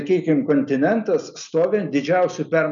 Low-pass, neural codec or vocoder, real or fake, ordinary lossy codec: 7.2 kHz; none; real; MP3, 96 kbps